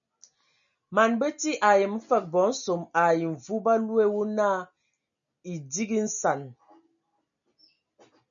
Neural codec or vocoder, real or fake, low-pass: none; real; 7.2 kHz